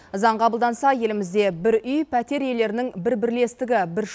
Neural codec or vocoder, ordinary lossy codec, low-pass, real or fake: none; none; none; real